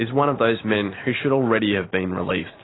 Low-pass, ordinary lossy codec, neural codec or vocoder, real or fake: 7.2 kHz; AAC, 16 kbps; none; real